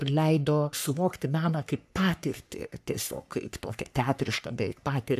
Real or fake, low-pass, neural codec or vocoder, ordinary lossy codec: fake; 14.4 kHz; codec, 44.1 kHz, 3.4 kbps, Pupu-Codec; AAC, 96 kbps